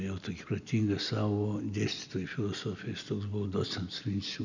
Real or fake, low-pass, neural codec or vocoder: real; 7.2 kHz; none